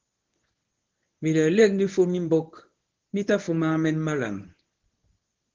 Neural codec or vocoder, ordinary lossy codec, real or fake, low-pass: codec, 24 kHz, 0.9 kbps, WavTokenizer, medium speech release version 1; Opus, 16 kbps; fake; 7.2 kHz